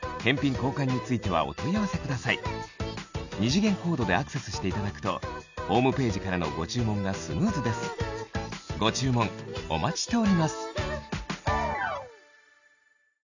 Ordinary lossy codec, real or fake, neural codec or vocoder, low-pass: none; real; none; 7.2 kHz